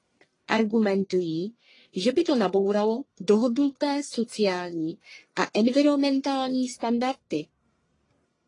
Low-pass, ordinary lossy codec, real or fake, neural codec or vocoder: 10.8 kHz; AAC, 32 kbps; fake; codec, 44.1 kHz, 1.7 kbps, Pupu-Codec